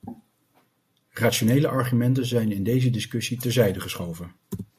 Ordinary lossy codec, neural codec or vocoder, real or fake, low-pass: MP3, 64 kbps; none; real; 14.4 kHz